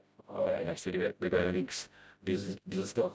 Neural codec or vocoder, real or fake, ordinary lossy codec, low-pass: codec, 16 kHz, 0.5 kbps, FreqCodec, smaller model; fake; none; none